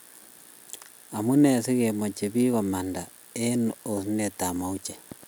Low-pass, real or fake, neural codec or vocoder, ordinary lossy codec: none; real; none; none